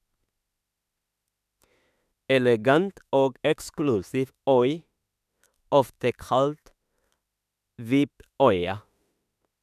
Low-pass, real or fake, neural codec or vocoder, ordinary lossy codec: 14.4 kHz; fake; autoencoder, 48 kHz, 32 numbers a frame, DAC-VAE, trained on Japanese speech; none